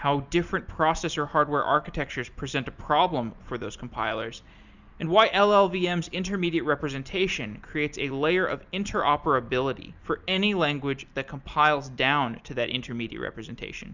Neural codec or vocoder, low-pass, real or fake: none; 7.2 kHz; real